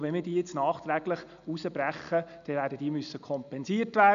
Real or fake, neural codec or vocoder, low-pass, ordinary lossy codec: real; none; 7.2 kHz; none